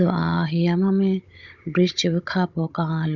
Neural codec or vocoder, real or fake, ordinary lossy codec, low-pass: codec, 16 kHz, 16 kbps, FunCodec, trained on Chinese and English, 50 frames a second; fake; Opus, 64 kbps; 7.2 kHz